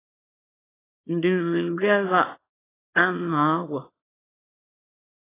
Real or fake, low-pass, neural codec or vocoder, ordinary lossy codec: fake; 3.6 kHz; codec, 24 kHz, 0.9 kbps, WavTokenizer, small release; AAC, 16 kbps